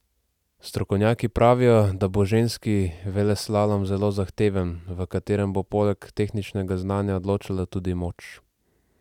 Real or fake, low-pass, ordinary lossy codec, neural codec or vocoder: real; 19.8 kHz; none; none